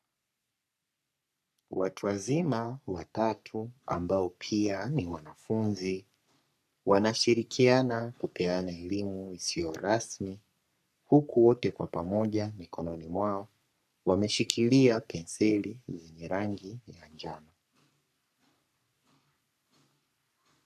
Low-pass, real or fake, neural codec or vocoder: 14.4 kHz; fake; codec, 44.1 kHz, 3.4 kbps, Pupu-Codec